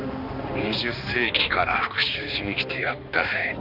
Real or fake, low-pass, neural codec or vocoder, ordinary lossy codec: fake; 5.4 kHz; codec, 16 kHz, 2 kbps, X-Codec, HuBERT features, trained on balanced general audio; none